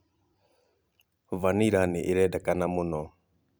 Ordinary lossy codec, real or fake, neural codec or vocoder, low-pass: none; fake; vocoder, 44.1 kHz, 128 mel bands every 256 samples, BigVGAN v2; none